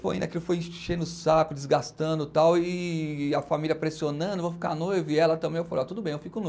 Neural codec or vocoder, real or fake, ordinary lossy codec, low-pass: none; real; none; none